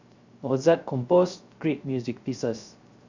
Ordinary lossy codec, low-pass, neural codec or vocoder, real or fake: Opus, 64 kbps; 7.2 kHz; codec, 16 kHz, 0.3 kbps, FocalCodec; fake